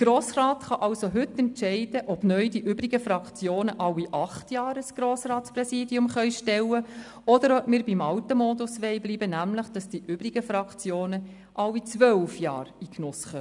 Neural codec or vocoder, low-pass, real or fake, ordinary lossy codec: none; 10.8 kHz; real; none